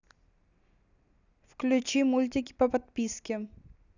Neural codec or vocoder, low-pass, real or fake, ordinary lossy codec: none; 7.2 kHz; real; none